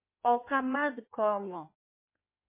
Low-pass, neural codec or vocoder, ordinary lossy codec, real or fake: 3.6 kHz; codec, 16 kHz in and 24 kHz out, 1.1 kbps, FireRedTTS-2 codec; MP3, 24 kbps; fake